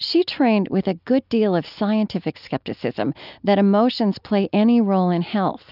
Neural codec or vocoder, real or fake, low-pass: none; real; 5.4 kHz